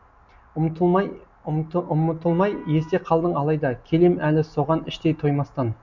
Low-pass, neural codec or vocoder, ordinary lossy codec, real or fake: 7.2 kHz; none; none; real